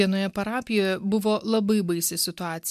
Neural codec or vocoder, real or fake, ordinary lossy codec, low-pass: none; real; MP3, 96 kbps; 14.4 kHz